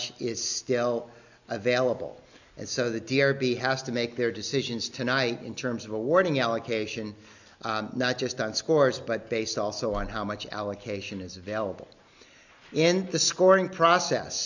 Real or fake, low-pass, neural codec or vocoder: real; 7.2 kHz; none